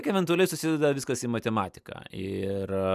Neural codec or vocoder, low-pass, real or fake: none; 14.4 kHz; real